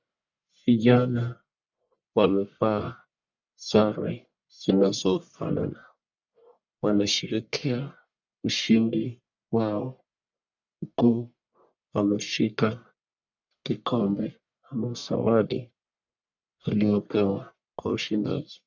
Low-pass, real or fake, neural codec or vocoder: 7.2 kHz; fake; codec, 44.1 kHz, 1.7 kbps, Pupu-Codec